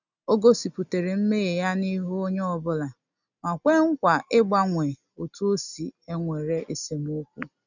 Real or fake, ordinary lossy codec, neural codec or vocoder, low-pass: real; none; none; 7.2 kHz